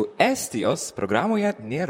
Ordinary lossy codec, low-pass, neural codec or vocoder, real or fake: MP3, 64 kbps; 19.8 kHz; vocoder, 44.1 kHz, 128 mel bands, Pupu-Vocoder; fake